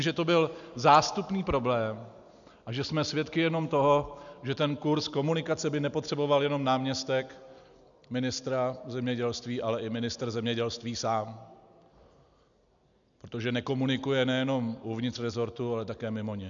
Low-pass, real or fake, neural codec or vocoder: 7.2 kHz; real; none